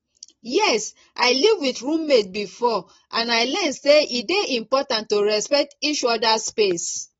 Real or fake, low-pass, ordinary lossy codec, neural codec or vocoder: real; 19.8 kHz; AAC, 24 kbps; none